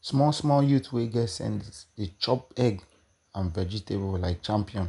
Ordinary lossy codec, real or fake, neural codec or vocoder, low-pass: none; real; none; 10.8 kHz